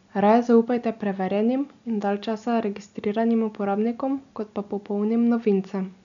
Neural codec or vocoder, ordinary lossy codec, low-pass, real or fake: none; none; 7.2 kHz; real